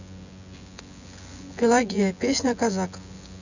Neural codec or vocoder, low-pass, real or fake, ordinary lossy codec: vocoder, 24 kHz, 100 mel bands, Vocos; 7.2 kHz; fake; none